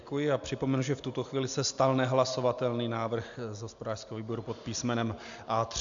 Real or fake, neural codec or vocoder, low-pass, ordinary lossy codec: real; none; 7.2 kHz; MP3, 64 kbps